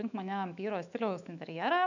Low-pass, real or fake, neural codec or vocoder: 7.2 kHz; fake; autoencoder, 48 kHz, 128 numbers a frame, DAC-VAE, trained on Japanese speech